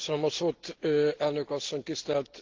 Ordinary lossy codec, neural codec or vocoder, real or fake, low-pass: Opus, 16 kbps; none; real; 7.2 kHz